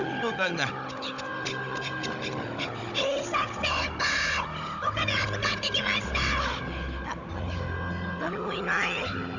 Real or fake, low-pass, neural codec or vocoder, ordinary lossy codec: fake; 7.2 kHz; codec, 16 kHz, 16 kbps, FunCodec, trained on Chinese and English, 50 frames a second; none